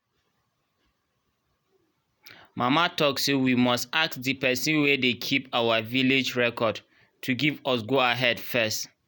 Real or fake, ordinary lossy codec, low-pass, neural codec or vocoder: real; none; none; none